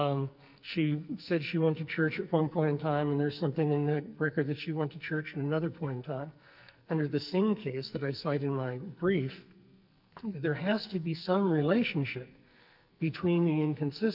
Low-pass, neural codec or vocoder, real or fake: 5.4 kHz; codec, 44.1 kHz, 2.6 kbps, SNAC; fake